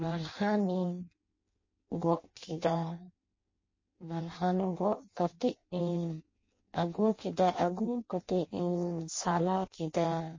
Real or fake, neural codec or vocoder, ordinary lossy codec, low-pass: fake; codec, 16 kHz in and 24 kHz out, 0.6 kbps, FireRedTTS-2 codec; MP3, 32 kbps; 7.2 kHz